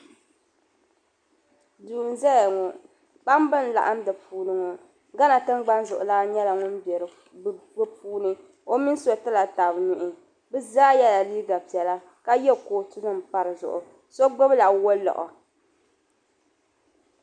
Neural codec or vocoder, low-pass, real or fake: none; 9.9 kHz; real